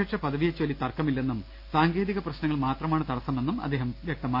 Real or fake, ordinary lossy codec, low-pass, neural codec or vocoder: real; none; 5.4 kHz; none